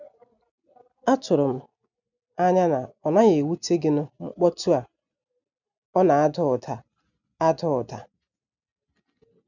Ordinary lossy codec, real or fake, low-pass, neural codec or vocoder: none; real; 7.2 kHz; none